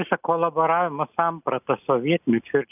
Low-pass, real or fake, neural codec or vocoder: 3.6 kHz; real; none